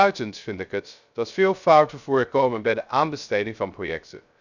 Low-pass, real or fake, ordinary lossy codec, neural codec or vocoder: 7.2 kHz; fake; none; codec, 16 kHz, 0.3 kbps, FocalCodec